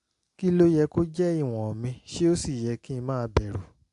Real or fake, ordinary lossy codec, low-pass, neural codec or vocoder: real; AAC, 64 kbps; 10.8 kHz; none